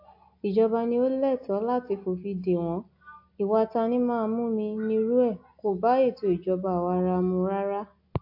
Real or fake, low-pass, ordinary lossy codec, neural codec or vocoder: real; 5.4 kHz; AAC, 48 kbps; none